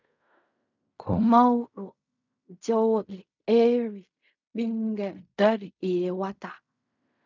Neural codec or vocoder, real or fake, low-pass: codec, 16 kHz in and 24 kHz out, 0.4 kbps, LongCat-Audio-Codec, fine tuned four codebook decoder; fake; 7.2 kHz